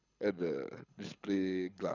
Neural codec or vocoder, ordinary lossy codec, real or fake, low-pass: codec, 24 kHz, 6 kbps, HILCodec; none; fake; 7.2 kHz